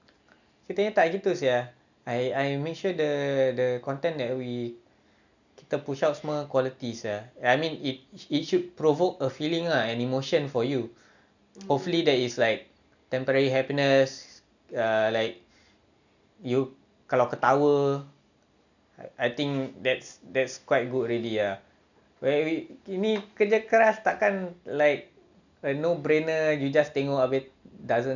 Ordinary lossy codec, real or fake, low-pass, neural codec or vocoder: none; real; 7.2 kHz; none